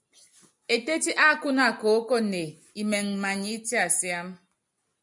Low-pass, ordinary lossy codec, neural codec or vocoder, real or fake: 10.8 kHz; MP3, 96 kbps; none; real